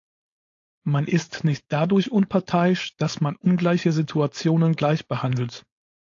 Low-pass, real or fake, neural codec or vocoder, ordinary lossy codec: 7.2 kHz; fake; codec, 16 kHz, 4.8 kbps, FACodec; AAC, 48 kbps